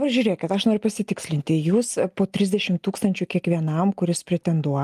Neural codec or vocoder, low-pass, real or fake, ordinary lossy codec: none; 14.4 kHz; real; Opus, 32 kbps